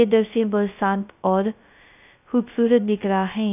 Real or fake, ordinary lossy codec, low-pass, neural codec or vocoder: fake; none; 3.6 kHz; codec, 16 kHz, 0.2 kbps, FocalCodec